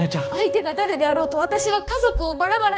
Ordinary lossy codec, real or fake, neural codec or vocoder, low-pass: none; fake; codec, 16 kHz, 2 kbps, X-Codec, HuBERT features, trained on balanced general audio; none